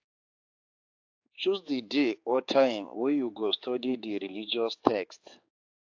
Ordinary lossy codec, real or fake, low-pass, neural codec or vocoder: AAC, 48 kbps; fake; 7.2 kHz; codec, 16 kHz, 4 kbps, X-Codec, HuBERT features, trained on general audio